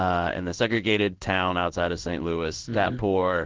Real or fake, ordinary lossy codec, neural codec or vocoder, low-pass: fake; Opus, 16 kbps; codec, 16 kHz in and 24 kHz out, 1 kbps, XY-Tokenizer; 7.2 kHz